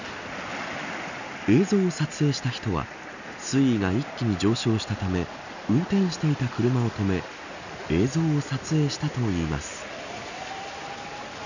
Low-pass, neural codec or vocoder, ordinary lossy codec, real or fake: 7.2 kHz; none; none; real